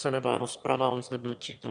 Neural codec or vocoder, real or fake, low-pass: autoencoder, 22.05 kHz, a latent of 192 numbers a frame, VITS, trained on one speaker; fake; 9.9 kHz